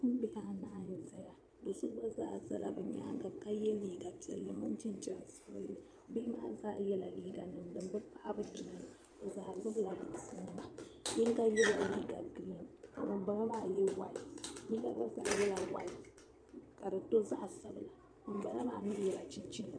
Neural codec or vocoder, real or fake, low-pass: vocoder, 22.05 kHz, 80 mel bands, Vocos; fake; 9.9 kHz